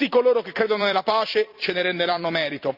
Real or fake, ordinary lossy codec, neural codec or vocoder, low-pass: fake; Opus, 64 kbps; vocoder, 44.1 kHz, 128 mel bands every 256 samples, BigVGAN v2; 5.4 kHz